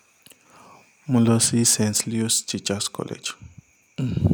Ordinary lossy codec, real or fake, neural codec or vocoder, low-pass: none; real; none; none